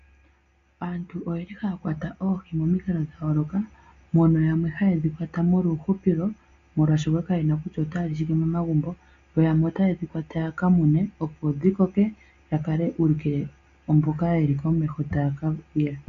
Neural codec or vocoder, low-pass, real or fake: none; 7.2 kHz; real